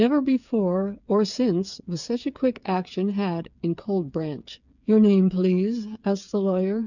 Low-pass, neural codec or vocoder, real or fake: 7.2 kHz; codec, 16 kHz, 8 kbps, FreqCodec, smaller model; fake